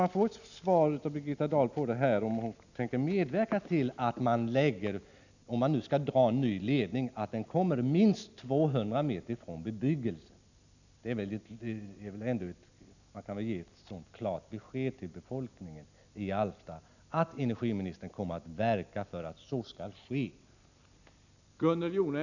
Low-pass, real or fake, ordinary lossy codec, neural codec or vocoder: 7.2 kHz; real; none; none